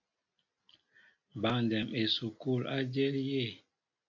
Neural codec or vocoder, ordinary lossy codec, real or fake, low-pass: none; AAC, 48 kbps; real; 7.2 kHz